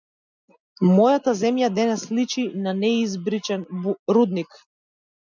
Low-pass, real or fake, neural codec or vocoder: 7.2 kHz; real; none